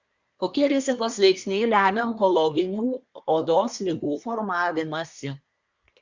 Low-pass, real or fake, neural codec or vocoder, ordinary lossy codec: 7.2 kHz; fake; codec, 24 kHz, 1 kbps, SNAC; Opus, 64 kbps